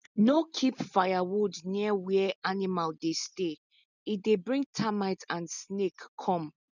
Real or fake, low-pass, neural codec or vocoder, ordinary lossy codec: real; 7.2 kHz; none; none